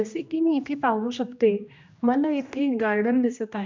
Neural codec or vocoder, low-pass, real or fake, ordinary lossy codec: codec, 16 kHz, 1 kbps, X-Codec, HuBERT features, trained on general audio; 7.2 kHz; fake; MP3, 64 kbps